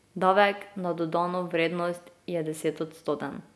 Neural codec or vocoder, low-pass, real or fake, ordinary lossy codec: none; none; real; none